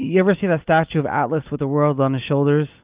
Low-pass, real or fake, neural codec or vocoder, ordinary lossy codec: 3.6 kHz; real; none; Opus, 24 kbps